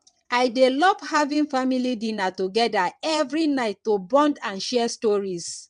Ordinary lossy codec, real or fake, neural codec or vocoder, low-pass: MP3, 96 kbps; fake; vocoder, 22.05 kHz, 80 mel bands, WaveNeXt; 9.9 kHz